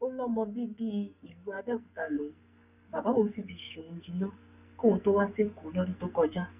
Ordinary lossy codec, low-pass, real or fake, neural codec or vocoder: none; 3.6 kHz; fake; codec, 44.1 kHz, 2.6 kbps, SNAC